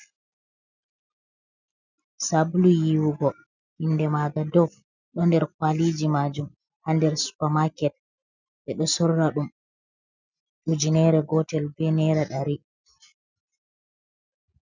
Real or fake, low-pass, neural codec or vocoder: real; 7.2 kHz; none